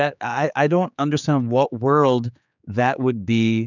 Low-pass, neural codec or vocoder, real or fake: 7.2 kHz; codec, 16 kHz, 4 kbps, X-Codec, HuBERT features, trained on general audio; fake